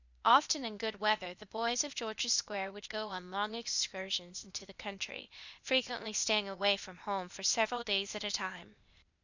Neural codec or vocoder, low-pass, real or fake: codec, 16 kHz, 0.8 kbps, ZipCodec; 7.2 kHz; fake